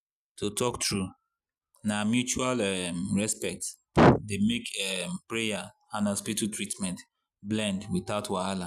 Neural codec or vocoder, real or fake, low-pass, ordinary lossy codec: none; real; 14.4 kHz; none